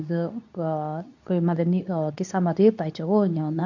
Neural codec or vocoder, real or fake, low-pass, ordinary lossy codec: codec, 24 kHz, 0.9 kbps, WavTokenizer, medium speech release version 2; fake; 7.2 kHz; none